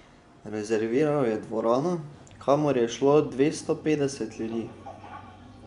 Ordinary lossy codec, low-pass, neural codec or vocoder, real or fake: none; 10.8 kHz; vocoder, 24 kHz, 100 mel bands, Vocos; fake